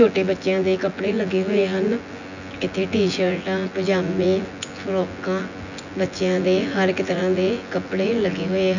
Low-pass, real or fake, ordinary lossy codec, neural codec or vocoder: 7.2 kHz; fake; none; vocoder, 24 kHz, 100 mel bands, Vocos